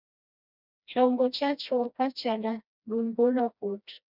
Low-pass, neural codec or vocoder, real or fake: 5.4 kHz; codec, 16 kHz, 1 kbps, FreqCodec, smaller model; fake